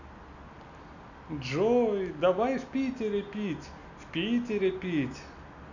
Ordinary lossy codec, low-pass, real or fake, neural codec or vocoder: none; 7.2 kHz; real; none